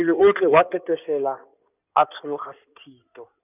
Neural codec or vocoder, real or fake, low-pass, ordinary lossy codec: codec, 16 kHz in and 24 kHz out, 2.2 kbps, FireRedTTS-2 codec; fake; 3.6 kHz; none